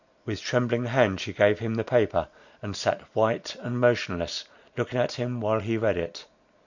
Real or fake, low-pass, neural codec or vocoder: real; 7.2 kHz; none